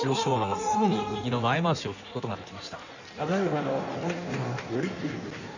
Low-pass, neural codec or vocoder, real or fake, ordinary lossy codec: 7.2 kHz; codec, 16 kHz in and 24 kHz out, 1.1 kbps, FireRedTTS-2 codec; fake; none